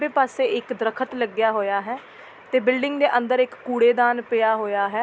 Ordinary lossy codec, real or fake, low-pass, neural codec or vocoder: none; real; none; none